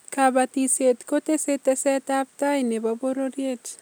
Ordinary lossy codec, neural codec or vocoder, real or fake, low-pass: none; none; real; none